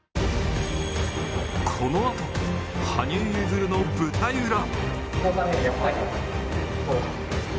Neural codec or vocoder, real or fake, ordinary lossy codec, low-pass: none; real; none; none